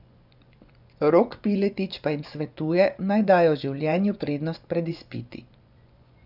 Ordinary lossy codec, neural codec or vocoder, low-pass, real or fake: none; vocoder, 24 kHz, 100 mel bands, Vocos; 5.4 kHz; fake